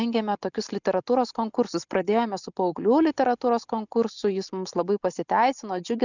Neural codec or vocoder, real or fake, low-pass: none; real; 7.2 kHz